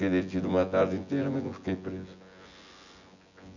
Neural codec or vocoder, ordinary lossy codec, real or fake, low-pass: vocoder, 24 kHz, 100 mel bands, Vocos; none; fake; 7.2 kHz